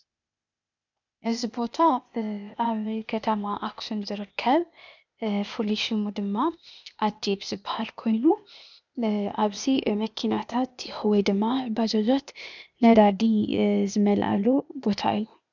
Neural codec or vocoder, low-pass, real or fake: codec, 16 kHz, 0.8 kbps, ZipCodec; 7.2 kHz; fake